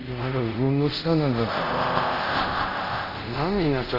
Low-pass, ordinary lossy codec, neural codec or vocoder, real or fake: 5.4 kHz; Opus, 32 kbps; codec, 24 kHz, 0.5 kbps, DualCodec; fake